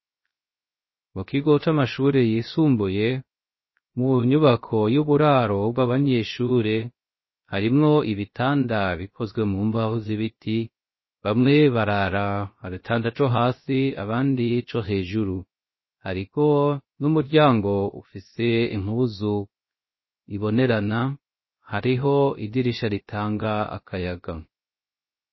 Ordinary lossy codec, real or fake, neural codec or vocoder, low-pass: MP3, 24 kbps; fake; codec, 16 kHz, 0.3 kbps, FocalCodec; 7.2 kHz